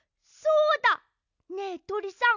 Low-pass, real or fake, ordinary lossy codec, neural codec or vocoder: 7.2 kHz; real; none; none